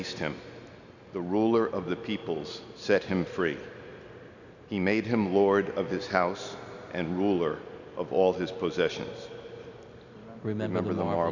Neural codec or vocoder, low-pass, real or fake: none; 7.2 kHz; real